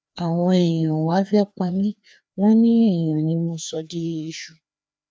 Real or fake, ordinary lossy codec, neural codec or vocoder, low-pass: fake; none; codec, 16 kHz, 2 kbps, FreqCodec, larger model; none